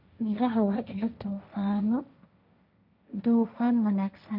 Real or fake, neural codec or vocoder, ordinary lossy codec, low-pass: fake; codec, 16 kHz, 1.1 kbps, Voila-Tokenizer; none; 5.4 kHz